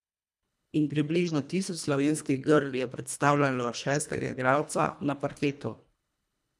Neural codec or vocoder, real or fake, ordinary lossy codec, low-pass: codec, 24 kHz, 1.5 kbps, HILCodec; fake; none; none